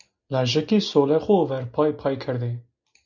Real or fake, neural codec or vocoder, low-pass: real; none; 7.2 kHz